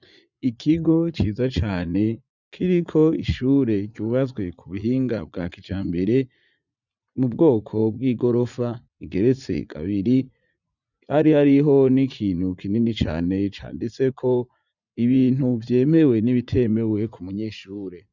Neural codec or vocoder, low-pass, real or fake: vocoder, 44.1 kHz, 80 mel bands, Vocos; 7.2 kHz; fake